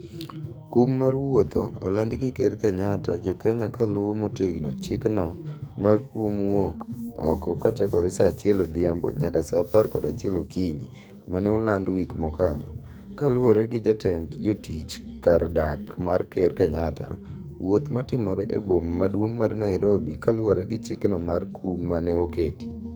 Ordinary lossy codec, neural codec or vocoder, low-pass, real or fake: none; codec, 44.1 kHz, 2.6 kbps, SNAC; none; fake